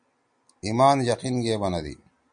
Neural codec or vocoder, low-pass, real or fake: none; 9.9 kHz; real